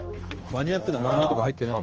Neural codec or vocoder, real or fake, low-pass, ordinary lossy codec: codec, 16 kHz, 2 kbps, X-Codec, HuBERT features, trained on general audio; fake; 7.2 kHz; Opus, 24 kbps